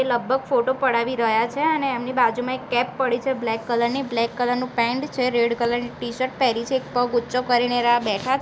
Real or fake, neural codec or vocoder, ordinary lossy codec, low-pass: real; none; none; none